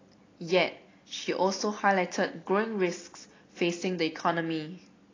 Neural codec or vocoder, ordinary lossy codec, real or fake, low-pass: none; AAC, 32 kbps; real; 7.2 kHz